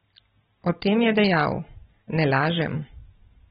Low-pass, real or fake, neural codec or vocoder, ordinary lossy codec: 9.9 kHz; real; none; AAC, 16 kbps